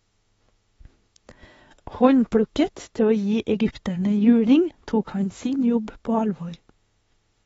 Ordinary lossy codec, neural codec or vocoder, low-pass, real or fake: AAC, 24 kbps; autoencoder, 48 kHz, 32 numbers a frame, DAC-VAE, trained on Japanese speech; 19.8 kHz; fake